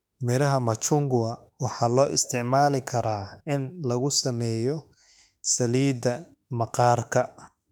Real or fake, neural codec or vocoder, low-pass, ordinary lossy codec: fake; autoencoder, 48 kHz, 32 numbers a frame, DAC-VAE, trained on Japanese speech; 19.8 kHz; none